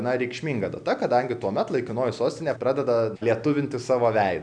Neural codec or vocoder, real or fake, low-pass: none; real; 9.9 kHz